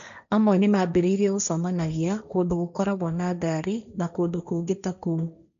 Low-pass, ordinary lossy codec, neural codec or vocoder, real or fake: 7.2 kHz; none; codec, 16 kHz, 1.1 kbps, Voila-Tokenizer; fake